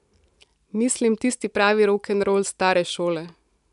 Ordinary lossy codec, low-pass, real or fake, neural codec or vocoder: none; 10.8 kHz; real; none